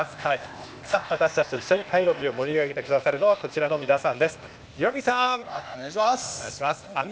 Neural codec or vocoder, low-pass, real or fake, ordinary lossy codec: codec, 16 kHz, 0.8 kbps, ZipCodec; none; fake; none